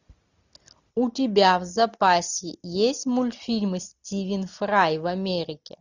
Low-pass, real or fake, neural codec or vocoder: 7.2 kHz; real; none